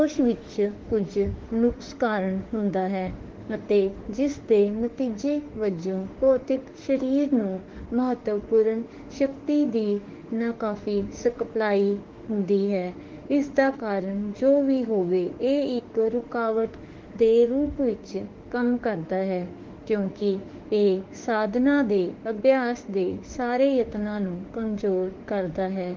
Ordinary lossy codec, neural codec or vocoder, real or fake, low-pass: Opus, 16 kbps; autoencoder, 48 kHz, 32 numbers a frame, DAC-VAE, trained on Japanese speech; fake; 7.2 kHz